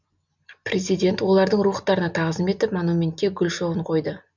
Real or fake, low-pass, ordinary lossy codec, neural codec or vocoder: real; 7.2 kHz; none; none